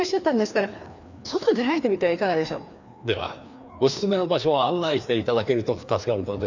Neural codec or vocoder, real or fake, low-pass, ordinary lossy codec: codec, 16 kHz, 2 kbps, FreqCodec, larger model; fake; 7.2 kHz; none